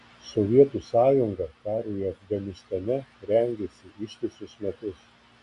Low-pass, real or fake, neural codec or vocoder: 10.8 kHz; real; none